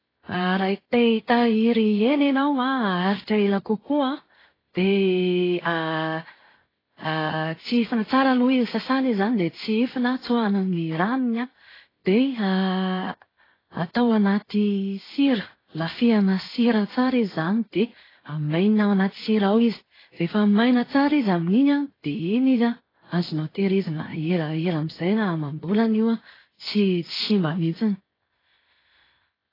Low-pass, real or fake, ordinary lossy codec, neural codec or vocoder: 5.4 kHz; real; AAC, 24 kbps; none